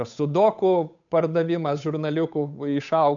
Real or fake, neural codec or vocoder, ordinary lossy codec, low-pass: fake; codec, 16 kHz, 8 kbps, FunCodec, trained on Chinese and English, 25 frames a second; MP3, 96 kbps; 7.2 kHz